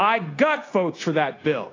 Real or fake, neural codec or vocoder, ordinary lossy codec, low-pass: fake; codec, 16 kHz, 0.9 kbps, LongCat-Audio-Codec; AAC, 32 kbps; 7.2 kHz